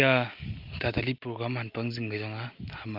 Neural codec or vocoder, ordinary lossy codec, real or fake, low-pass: none; Opus, 24 kbps; real; 5.4 kHz